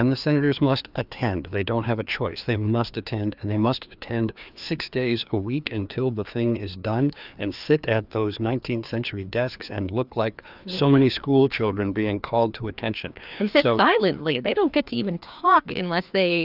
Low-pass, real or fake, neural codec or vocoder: 5.4 kHz; fake; codec, 16 kHz, 2 kbps, FreqCodec, larger model